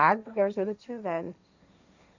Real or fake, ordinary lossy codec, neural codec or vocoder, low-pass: fake; none; codec, 16 kHz, 1.1 kbps, Voila-Tokenizer; 7.2 kHz